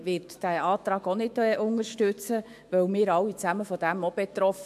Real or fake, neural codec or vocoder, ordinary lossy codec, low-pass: fake; autoencoder, 48 kHz, 128 numbers a frame, DAC-VAE, trained on Japanese speech; MP3, 64 kbps; 14.4 kHz